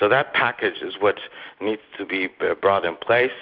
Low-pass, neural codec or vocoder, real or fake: 5.4 kHz; none; real